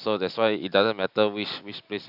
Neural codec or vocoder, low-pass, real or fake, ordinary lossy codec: none; 5.4 kHz; real; none